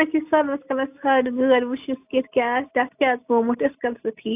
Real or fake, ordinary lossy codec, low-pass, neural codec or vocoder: real; AAC, 32 kbps; 3.6 kHz; none